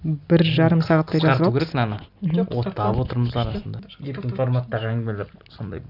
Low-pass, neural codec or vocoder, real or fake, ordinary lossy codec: 5.4 kHz; none; real; none